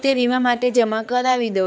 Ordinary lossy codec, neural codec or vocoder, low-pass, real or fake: none; codec, 16 kHz, 4 kbps, X-Codec, HuBERT features, trained on balanced general audio; none; fake